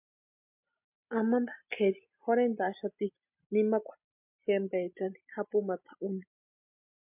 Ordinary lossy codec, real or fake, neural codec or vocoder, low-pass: MP3, 32 kbps; real; none; 3.6 kHz